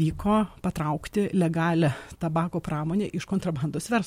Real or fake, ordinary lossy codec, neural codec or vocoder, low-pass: real; MP3, 64 kbps; none; 19.8 kHz